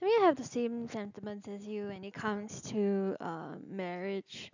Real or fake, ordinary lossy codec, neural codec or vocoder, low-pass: real; none; none; 7.2 kHz